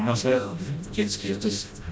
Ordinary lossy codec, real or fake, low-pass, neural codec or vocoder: none; fake; none; codec, 16 kHz, 0.5 kbps, FreqCodec, smaller model